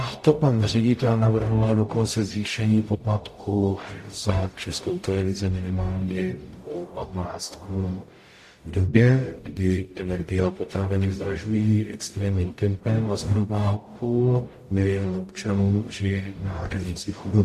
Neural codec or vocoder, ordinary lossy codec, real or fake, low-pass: codec, 44.1 kHz, 0.9 kbps, DAC; AAC, 64 kbps; fake; 14.4 kHz